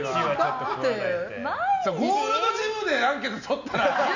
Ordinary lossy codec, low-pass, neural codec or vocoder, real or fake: none; 7.2 kHz; none; real